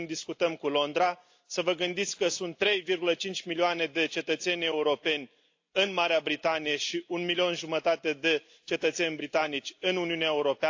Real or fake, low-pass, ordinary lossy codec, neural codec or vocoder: real; 7.2 kHz; AAC, 48 kbps; none